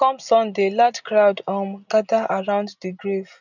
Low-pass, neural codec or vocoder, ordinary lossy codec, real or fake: 7.2 kHz; none; none; real